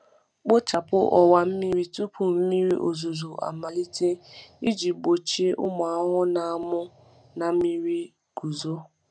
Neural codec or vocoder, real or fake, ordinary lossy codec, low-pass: none; real; none; 9.9 kHz